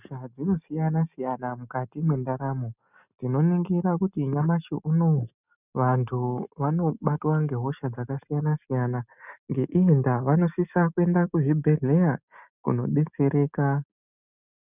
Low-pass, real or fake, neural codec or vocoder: 3.6 kHz; real; none